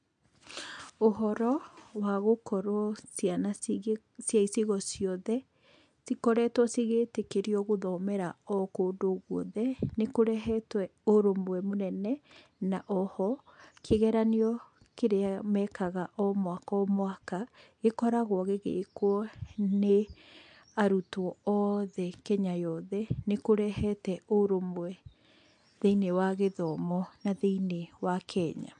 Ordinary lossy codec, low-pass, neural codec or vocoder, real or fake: MP3, 64 kbps; 9.9 kHz; none; real